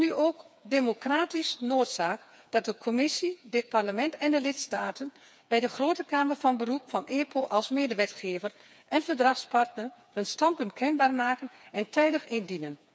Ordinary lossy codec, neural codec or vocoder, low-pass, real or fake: none; codec, 16 kHz, 4 kbps, FreqCodec, smaller model; none; fake